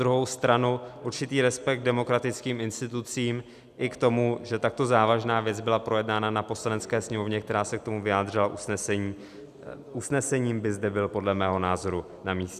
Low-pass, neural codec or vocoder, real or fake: 14.4 kHz; none; real